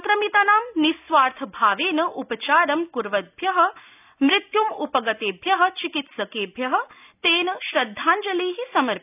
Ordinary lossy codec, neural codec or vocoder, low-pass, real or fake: none; none; 3.6 kHz; real